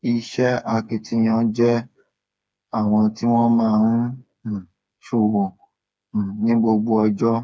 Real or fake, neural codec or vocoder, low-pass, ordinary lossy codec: fake; codec, 16 kHz, 4 kbps, FreqCodec, smaller model; none; none